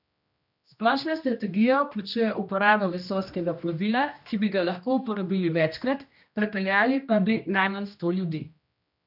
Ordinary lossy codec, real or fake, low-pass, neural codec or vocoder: none; fake; 5.4 kHz; codec, 16 kHz, 1 kbps, X-Codec, HuBERT features, trained on general audio